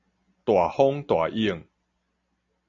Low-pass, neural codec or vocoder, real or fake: 7.2 kHz; none; real